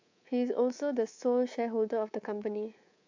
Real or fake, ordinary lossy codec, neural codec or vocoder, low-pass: fake; AAC, 48 kbps; codec, 24 kHz, 3.1 kbps, DualCodec; 7.2 kHz